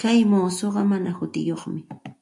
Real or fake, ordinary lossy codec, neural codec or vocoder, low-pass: real; AAC, 64 kbps; none; 10.8 kHz